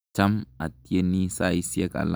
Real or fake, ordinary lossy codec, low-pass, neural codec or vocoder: real; none; none; none